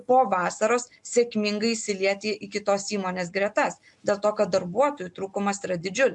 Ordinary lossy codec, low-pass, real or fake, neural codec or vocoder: MP3, 64 kbps; 10.8 kHz; real; none